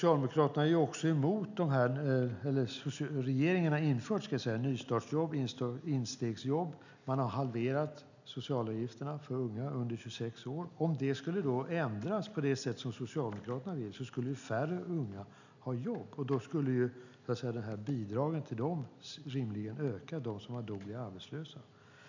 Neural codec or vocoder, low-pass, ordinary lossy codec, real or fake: none; 7.2 kHz; none; real